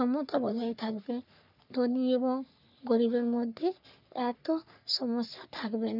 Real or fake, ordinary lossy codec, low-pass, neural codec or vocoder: fake; none; 5.4 kHz; codec, 44.1 kHz, 3.4 kbps, Pupu-Codec